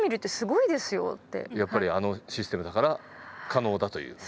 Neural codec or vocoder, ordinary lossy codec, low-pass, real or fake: none; none; none; real